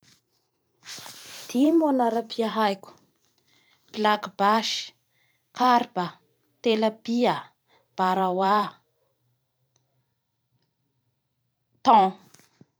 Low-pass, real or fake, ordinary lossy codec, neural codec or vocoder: none; fake; none; vocoder, 44.1 kHz, 128 mel bands every 512 samples, BigVGAN v2